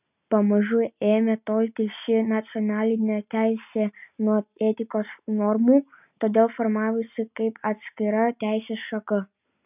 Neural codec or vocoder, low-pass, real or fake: none; 3.6 kHz; real